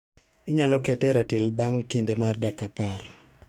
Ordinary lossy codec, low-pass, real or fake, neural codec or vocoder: none; 19.8 kHz; fake; codec, 44.1 kHz, 2.6 kbps, DAC